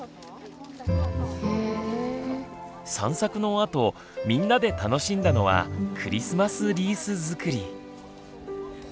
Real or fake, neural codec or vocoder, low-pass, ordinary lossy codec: real; none; none; none